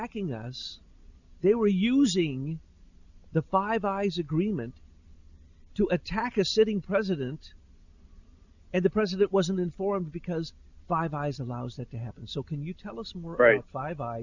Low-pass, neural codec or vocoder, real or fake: 7.2 kHz; none; real